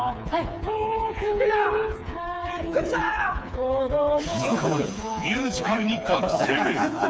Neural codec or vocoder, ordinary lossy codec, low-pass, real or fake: codec, 16 kHz, 4 kbps, FreqCodec, smaller model; none; none; fake